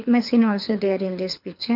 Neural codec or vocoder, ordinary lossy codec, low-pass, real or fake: codec, 24 kHz, 6 kbps, HILCodec; none; 5.4 kHz; fake